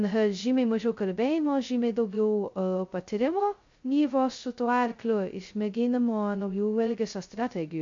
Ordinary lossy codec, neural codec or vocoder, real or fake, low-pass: MP3, 48 kbps; codec, 16 kHz, 0.2 kbps, FocalCodec; fake; 7.2 kHz